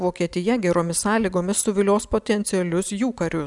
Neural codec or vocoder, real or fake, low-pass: none; real; 10.8 kHz